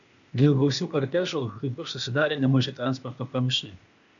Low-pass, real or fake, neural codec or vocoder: 7.2 kHz; fake; codec, 16 kHz, 0.8 kbps, ZipCodec